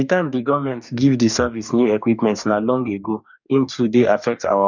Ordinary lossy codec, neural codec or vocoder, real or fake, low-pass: none; codec, 44.1 kHz, 2.6 kbps, DAC; fake; 7.2 kHz